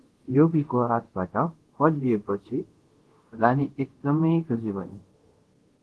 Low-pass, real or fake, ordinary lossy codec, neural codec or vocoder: 10.8 kHz; fake; Opus, 16 kbps; codec, 24 kHz, 0.5 kbps, DualCodec